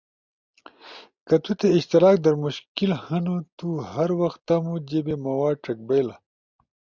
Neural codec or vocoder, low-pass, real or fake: none; 7.2 kHz; real